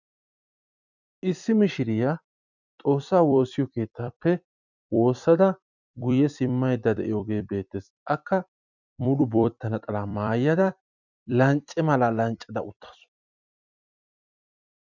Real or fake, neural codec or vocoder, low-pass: fake; vocoder, 44.1 kHz, 128 mel bands every 256 samples, BigVGAN v2; 7.2 kHz